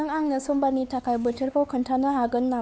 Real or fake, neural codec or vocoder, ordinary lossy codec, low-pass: fake; codec, 16 kHz, 4 kbps, X-Codec, WavLM features, trained on Multilingual LibriSpeech; none; none